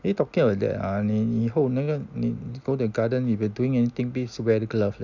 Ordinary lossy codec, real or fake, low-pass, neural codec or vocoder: none; real; 7.2 kHz; none